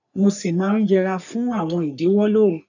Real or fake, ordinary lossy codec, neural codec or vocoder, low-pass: fake; none; codec, 44.1 kHz, 3.4 kbps, Pupu-Codec; 7.2 kHz